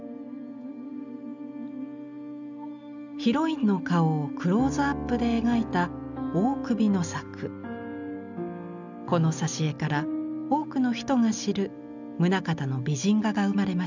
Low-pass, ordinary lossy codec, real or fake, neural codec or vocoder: 7.2 kHz; none; real; none